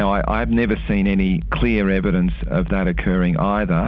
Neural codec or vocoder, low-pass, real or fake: none; 7.2 kHz; real